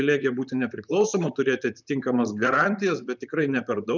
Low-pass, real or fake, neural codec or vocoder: 7.2 kHz; fake; vocoder, 44.1 kHz, 128 mel bands, Pupu-Vocoder